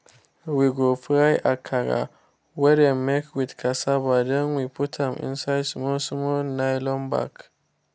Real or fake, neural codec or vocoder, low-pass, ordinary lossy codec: real; none; none; none